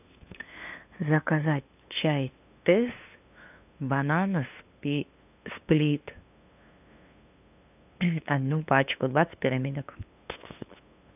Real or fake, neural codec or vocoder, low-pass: fake; codec, 16 kHz, 2 kbps, FunCodec, trained on LibriTTS, 25 frames a second; 3.6 kHz